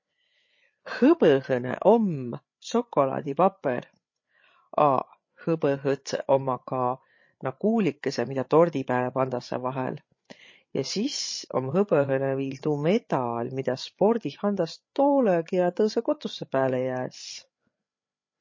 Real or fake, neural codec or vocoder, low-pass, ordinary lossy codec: fake; codec, 16 kHz, 8 kbps, FreqCodec, larger model; 7.2 kHz; MP3, 32 kbps